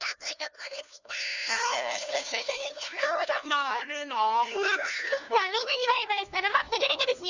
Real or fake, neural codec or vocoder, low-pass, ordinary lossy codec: fake; codec, 16 kHz, 1 kbps, FunCodec, trained on LibriTTS, 50 frames a second; 7.2 kHz; none